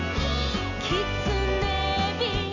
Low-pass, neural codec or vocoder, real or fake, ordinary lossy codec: 7.2 kHz; none; real; none